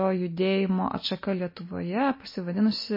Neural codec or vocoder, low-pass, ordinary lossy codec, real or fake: none; 5.4 kHz; MP3, 24 kbps; real